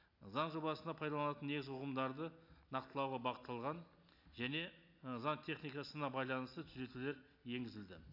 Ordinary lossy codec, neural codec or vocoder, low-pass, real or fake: none; none; 5.4 kHz; real